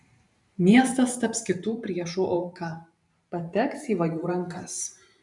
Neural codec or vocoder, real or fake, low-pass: vocoder, 44.1 kHz, 128 mel bands every 512 samples, BigVGAN v2; fake; 10.8 kHz